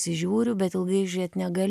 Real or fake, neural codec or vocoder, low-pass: real; none; 14.4 kHz